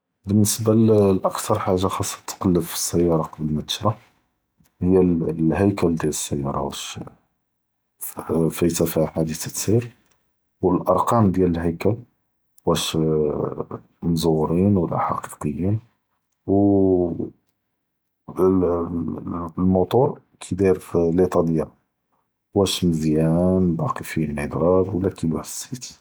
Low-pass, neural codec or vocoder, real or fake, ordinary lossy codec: none; none; real; none